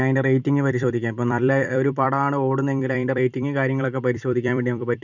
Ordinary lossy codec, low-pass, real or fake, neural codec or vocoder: none; 7.2 kHz; fake; vocoder, 44.1 kHz, 128 mel bands every 256 samples, BigVGAN v2